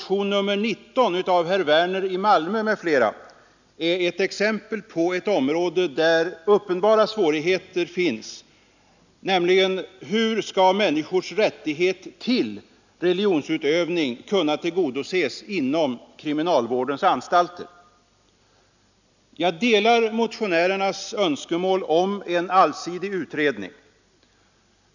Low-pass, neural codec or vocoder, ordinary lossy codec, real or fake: 7.2 kHz; none; none; real